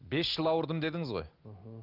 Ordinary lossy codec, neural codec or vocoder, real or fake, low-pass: Opus, 32 kbps; none; real; 5.4 kHz